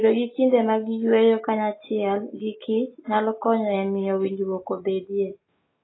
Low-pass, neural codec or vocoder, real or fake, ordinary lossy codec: 7.2 kHz; none; real; AAC, 16 kbps